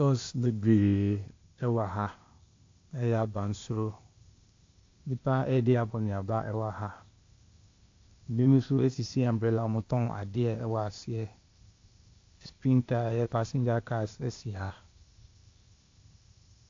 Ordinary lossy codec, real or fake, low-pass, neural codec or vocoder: AAC, 48 kbps; fake; 7.2 kHz; codec, 16 kHz, 0.8 kbps, ZipCodec